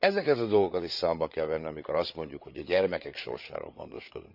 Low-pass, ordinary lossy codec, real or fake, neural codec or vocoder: 5.4 kHz; none; fake; codec, 16 kHz, 8 kbps, FreqCodec, larger model